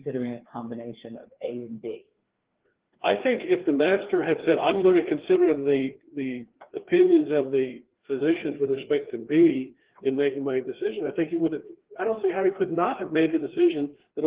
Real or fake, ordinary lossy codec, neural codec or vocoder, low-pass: fake; Opus, 16 kbps; codec, 16 kHz, 2 kbps, FreqCodec, larger model; 3.6 kHz